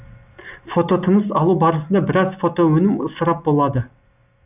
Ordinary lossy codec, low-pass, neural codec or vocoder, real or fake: none; 3.6 kHz; none; real